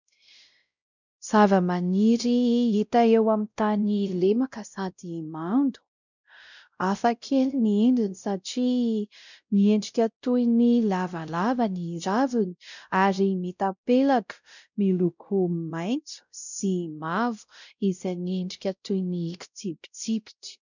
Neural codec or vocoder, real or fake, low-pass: codec, 16 kHz, 0.5 kbps, X-Codec, WavLM features, trained on Multilingual LibriSpeech; fake; 7.2 kHz